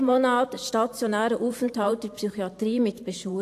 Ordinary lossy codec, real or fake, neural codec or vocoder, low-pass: AAC, 64 kbps; fake; vocoder, 44.1 kHz, 128 mel bands every 512 samples, BigVGAN v2; 14.4 kHz